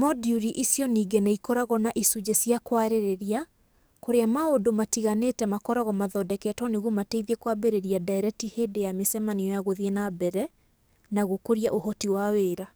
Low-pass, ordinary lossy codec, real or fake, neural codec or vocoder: none; none; fake; codec, 44.1 kHz, 7.8 kbps, DAC